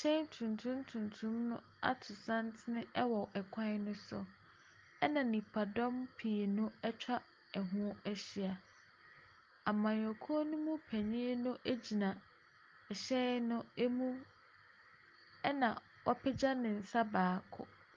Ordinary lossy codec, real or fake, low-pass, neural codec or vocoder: Opus, 32 kbps; real; 7.2 kHz; none